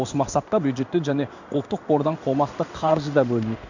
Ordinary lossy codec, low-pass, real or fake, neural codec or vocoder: none; 7.2 kHz; fake; codec, 16 kHz in and 24 kHz out, 1 kbps, XY-Tokenizer